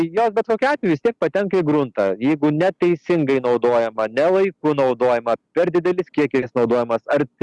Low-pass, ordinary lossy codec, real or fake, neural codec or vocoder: 10.8 kHz; Opus, 64 kbps; real; none